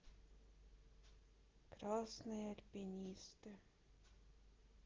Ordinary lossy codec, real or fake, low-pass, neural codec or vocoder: Opus, 16 kbps; real; 7.2 kHz; none